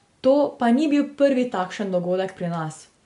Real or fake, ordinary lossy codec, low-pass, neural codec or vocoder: real; MP3, 64 kbps; 10.8 kHz; none